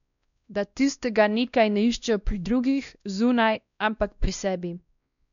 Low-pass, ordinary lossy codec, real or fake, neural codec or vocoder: 7.2 kHz; none; fake; codec, 16 kHz, 1 kbps, X-Codec, WavLM features, trained on Multilingual LibriSpeech